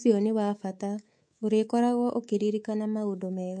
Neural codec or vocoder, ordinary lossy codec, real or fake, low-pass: codec, 24 kHz, 3.1 kbps, DualCodec; MP3, 48 kbps; fake; 9.9 kHz